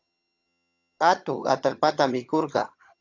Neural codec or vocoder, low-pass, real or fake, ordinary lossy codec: vocoder, 22.05 kHz, 80 mel bands, HiFi-GAN; 7.2 kHz; fake; AAC, 48 kbps